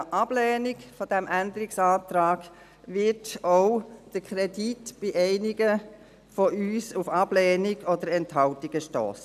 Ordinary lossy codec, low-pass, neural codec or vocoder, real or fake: none; 14.4 kHz; none; real